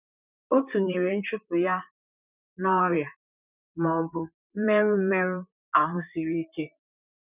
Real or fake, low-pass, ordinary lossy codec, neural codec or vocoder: fake; 3.6 kHz; none; vocoder, 44.1 kHz, 128 mel bands, Pupu-Vocoder